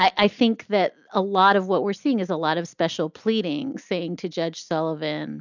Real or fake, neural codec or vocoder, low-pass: real; none; 7.2 kHz